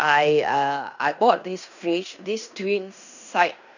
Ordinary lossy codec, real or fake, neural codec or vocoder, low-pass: none; fake; codec, 16 kHz in and 24 kHz out, 0.9 kbps, LongCat-Audio-Codec, fine tuned four codebook decoder; 7.2 kHz